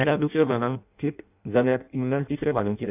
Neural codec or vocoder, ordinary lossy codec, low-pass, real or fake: codec, 16 kHz in and 24 kHz out, 0.6 kbps, FireRedTTS-2 codec; none; 3.6 kHz; fake